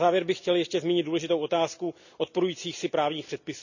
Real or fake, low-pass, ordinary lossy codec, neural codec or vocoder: real; 7.2 kHz; none; none